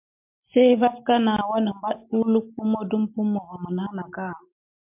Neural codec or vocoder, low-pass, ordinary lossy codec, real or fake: none; 3.6 kHz; MP3, 32 kbps; real